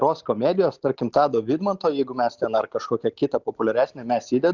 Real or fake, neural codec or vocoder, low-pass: real; none; 7.2 kHz